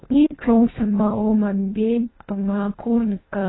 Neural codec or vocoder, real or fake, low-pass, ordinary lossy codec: codec, 24 kHz, 1.5 kbps, HILCodec; fake; 7.2 kHz; AAC, 16 kbps